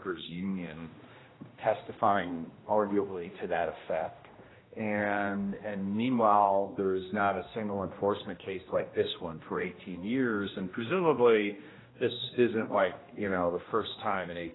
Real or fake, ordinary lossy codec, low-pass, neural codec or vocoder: fake; AAC, 16 kbps; 7.2 kHz; codec, 16 kHz, 1 kbps, X-Codec, HuBERT features, trained on general audio